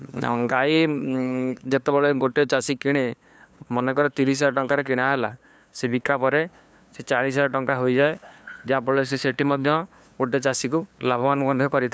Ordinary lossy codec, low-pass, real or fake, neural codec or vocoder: none; none; fake; codec, 16 kHz, 2 kbps, FunCodec, trained on LibriTTS, 25 frames a second